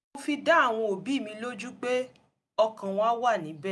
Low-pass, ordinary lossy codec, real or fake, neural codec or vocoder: none; none; real; none